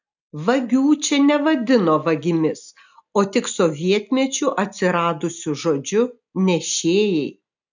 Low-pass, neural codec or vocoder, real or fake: 7.2 kHz; none; real